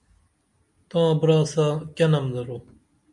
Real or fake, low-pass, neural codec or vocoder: real; 10.8 kHz; none